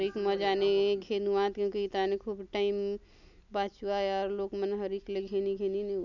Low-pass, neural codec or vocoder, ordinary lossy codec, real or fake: 7.2 kHz; none; none; real